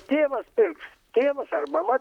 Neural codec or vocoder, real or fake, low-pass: vocoder, 44.1 kHz, 128 mel bands, Pupu-Vocoder; fake; 19.8 kHz